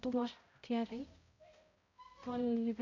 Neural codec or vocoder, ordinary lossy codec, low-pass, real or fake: codec, 16 kHz, 0.5 kbps, X-Codec, HuBERT features, trained on balanced general audio; none; 7.2 kHz; fake